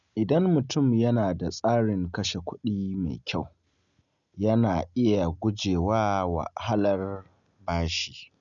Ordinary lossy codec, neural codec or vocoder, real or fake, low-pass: none; none; real; 7.2 kHz